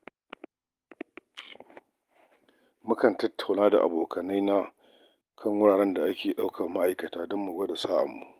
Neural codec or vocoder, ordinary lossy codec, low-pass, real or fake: none; Opus, 32 kbps; 14.4 kHz; real